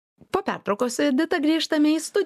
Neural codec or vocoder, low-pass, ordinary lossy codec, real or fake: none; 14.4 kHz; AAC, 96 kbps; real